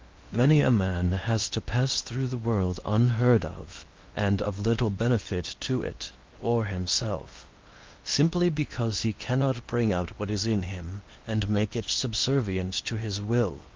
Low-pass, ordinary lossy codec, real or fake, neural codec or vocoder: 7.2 kHz; Opus, 32 kbps; fake; codec, 16 kHz in and 24 kHz out, 0.8 kbps, FocalCodec, streaming, 65536 codes